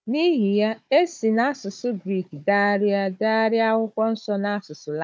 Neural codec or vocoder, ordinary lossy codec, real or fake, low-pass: codec, 16 kHz, 16 kbps, FunCodec, trained on Chinese and English, 50 frames a second; none; fake; none